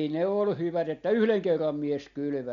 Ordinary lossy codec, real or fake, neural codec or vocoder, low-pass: none; real; none; 7.2 kHz